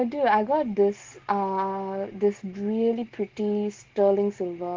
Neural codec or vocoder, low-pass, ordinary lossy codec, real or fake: none; 7.2 kHz; Opus, 16 kbps; real